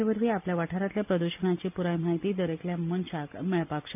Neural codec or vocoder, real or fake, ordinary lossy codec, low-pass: none; real; none; 3.6 kHz